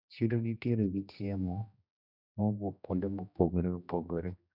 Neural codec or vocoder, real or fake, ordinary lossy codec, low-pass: codec, 16 kHz, 1 kbps, X-Codec, HuBERT features, trained on general audio; fake; none; 5.4 kHz